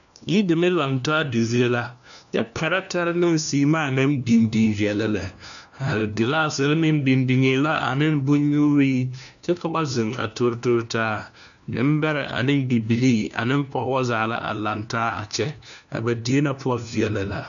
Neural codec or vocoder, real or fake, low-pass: codec, 16 kHz, 1 kbps, FunCodec, trained on LibriTTS, 50 frames a second; fake; 7.2 kHz